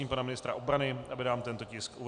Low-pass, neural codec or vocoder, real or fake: 9.9 kHz; none; real